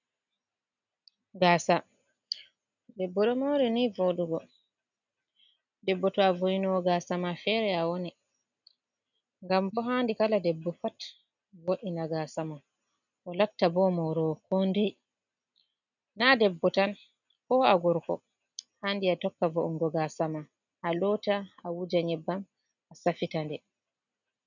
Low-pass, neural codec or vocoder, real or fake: 7.2 kHz; none; real